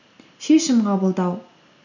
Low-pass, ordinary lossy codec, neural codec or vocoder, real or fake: 7.2 kHz; none; none; real